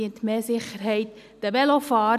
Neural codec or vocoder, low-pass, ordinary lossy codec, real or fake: none; 14.4 kHz; none; real